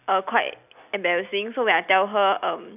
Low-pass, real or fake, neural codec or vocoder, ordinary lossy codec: 3.6 kHz; real; none; none